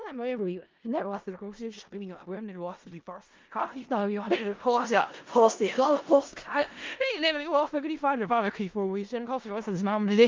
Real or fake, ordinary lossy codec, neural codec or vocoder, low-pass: fake; Opus, 24 kbps; codec, 16 kHz in and 24 kHz out, 0.4 kbps, LongCat-Audio-Codec, four codebook decoder; 7.2 kHz